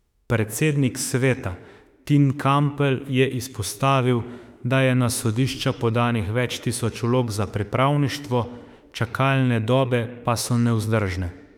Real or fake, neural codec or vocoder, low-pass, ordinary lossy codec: fake; autoencoder, 48 kHz, 32 numbers a frame, DAC-VAE, trained on Japanese speech; 19.8 kHz; none